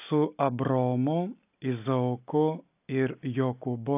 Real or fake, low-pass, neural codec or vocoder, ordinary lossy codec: real; 3.6 kHz; none; AAC, 32 kbps